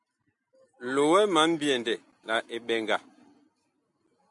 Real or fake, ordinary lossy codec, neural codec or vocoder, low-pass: real; MP3, 64 kbps; none; 10.8 kHz